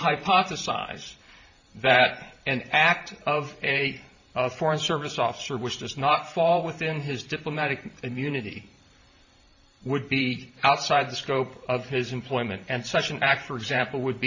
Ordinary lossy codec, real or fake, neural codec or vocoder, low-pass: MP3, 48 kbps; fake; vocoder, 44.1 kHz, 128 mel bands every 512 samples, BigVGAN v2; 7.2 kHz